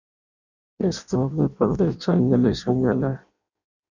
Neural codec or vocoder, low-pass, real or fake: codec, 16 kHz in and 24 kHz out, 0.6 kbps, FireRedTTS-2 codec; 7.2 kHz; fake